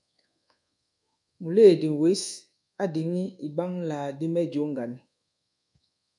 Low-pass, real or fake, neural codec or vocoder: 10.8 kHz; fake; codec, 24 kHz, 1.2 kbps, DualCodec